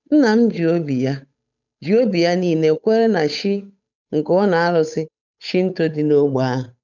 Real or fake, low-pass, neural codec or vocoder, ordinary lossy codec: fake; 7.2 kHz; codec, 16 kHz, 8 kbps, FunCodec, trained on Chinese and English, 25 frames a second; none